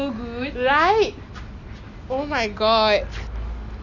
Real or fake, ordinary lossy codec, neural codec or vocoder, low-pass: real; none; none; 7.2 kHz